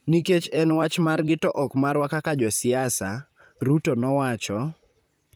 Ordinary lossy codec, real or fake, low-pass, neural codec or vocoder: none; fake; none; vocoder, 44.1 kHz, 128 mel bands, Pupu-Vocoder